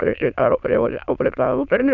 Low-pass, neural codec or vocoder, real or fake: 7.2 kHz; autoencoder, 22.05 kHz, a latent of 192 numbers a frame, VITS, trained on many speakers; fake